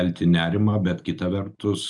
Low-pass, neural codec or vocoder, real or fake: 10.8 kHz; none; real